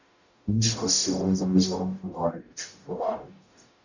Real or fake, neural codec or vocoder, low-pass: fake; codec, 44.1 kHz, 0.9 kbps, DAC; 7.2 kHz